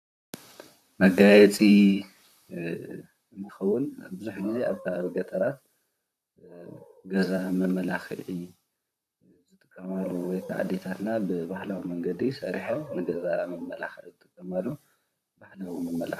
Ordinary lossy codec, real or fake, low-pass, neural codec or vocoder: AAC, 96 kbps; fake; 14.4 kHz; vocoder, 44.1 kHz, 128 mel bands, Pupu-Vocoder